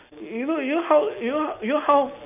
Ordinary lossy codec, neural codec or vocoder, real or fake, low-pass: none; none; real; 3.6 kHz